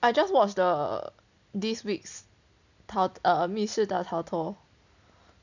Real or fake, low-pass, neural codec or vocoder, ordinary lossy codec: real; 7.2 kHz; none; none